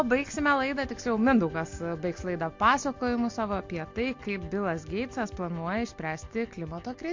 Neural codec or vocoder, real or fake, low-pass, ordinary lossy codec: none; real; 7.2 kHz; MP3, 48 kbps